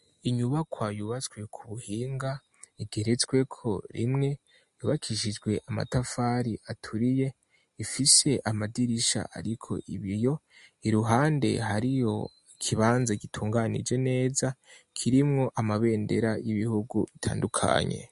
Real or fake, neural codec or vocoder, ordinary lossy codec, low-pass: real; none; MP3, 48 kbps; 14.4 kHz